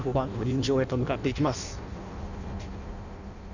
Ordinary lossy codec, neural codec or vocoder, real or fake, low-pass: none; codec, 16 kHz in and 24 kHz out, 0.6 kbps, FireRedTTS-2 codec; fake; 7.2 kHz